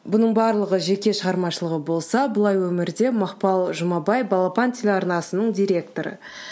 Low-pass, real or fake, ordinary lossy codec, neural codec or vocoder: none; real; none; none